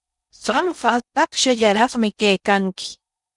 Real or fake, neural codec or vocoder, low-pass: fake; codec, 16 kHz in and 24 kHz out, 0.6 kbps, FocalCodec, streaming, 4096 codes; 10.8 kHz